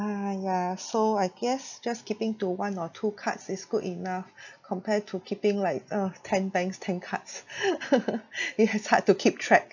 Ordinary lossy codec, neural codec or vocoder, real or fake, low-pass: none; none; real; 7.2 kHz